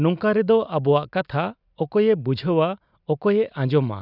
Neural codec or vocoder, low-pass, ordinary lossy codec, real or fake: none; 5.4 kHz; none; real